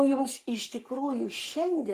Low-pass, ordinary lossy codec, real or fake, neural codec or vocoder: 14.4 kHz; Opus, 32 kbps; fake; codec, 44.1 kHz, 3.4 kbps, Pupu-Codec